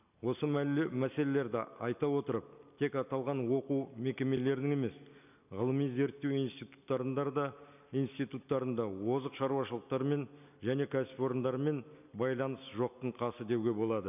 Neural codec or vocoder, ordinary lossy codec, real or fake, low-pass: none; none; real; 3.6 kHz